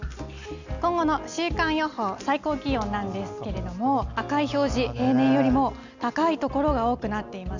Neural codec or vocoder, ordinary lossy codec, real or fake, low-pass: none; none; real; 7.2 kHz